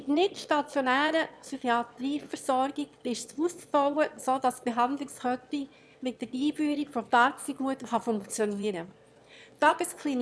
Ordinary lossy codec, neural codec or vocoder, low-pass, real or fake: none; autoencoder, 22.05 kHz, a latent of 192 numbers a frame, VITS, trained on one speaker; none; fake